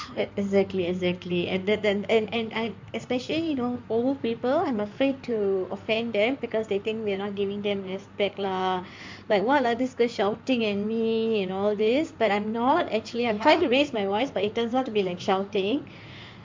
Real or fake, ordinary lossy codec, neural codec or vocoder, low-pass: fake; MP3, 64 kbps; codec, 16 kHz in and 24 kHz out, 2.2 kbps, FireRedTTS-2 codec; 7.2 kHz